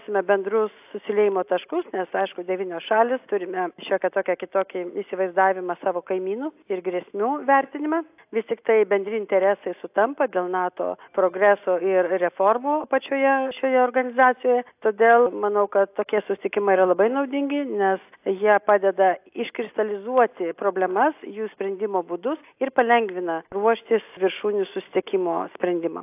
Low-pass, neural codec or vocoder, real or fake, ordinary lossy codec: 3.6 kHz; none; real; AAC, 32 kbps